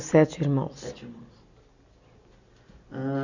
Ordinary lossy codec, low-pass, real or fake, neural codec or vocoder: Opus, 64 kbps; 7.2 kHz; real; none